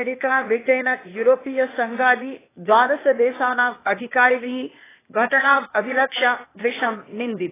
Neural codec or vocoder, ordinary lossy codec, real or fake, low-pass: codec, 16 kHz, 0.8 kbps, ZipCodec; AAC, 16 kbps; fake; 3.6 kHz